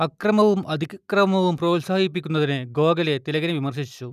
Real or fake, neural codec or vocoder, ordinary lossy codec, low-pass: real; none; none; 14.4 kHz